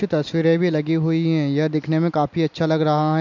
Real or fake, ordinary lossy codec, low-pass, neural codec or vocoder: real; none; 7.2 kHz; none